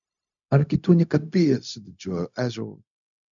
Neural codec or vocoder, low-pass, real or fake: codec, 16 kHz, 0.4 kbps, LongCat-Audio-Codec; 7.2 kHz; fake